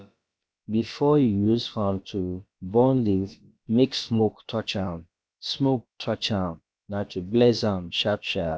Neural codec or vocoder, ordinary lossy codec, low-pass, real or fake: codec, 16 kHz, about 1 kbps, DyCAST, with the encoder's durations; none; none; fake